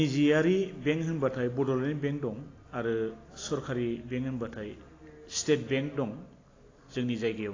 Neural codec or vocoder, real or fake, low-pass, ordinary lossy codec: none; real; 7.2 kHz; AAC, 32 kbps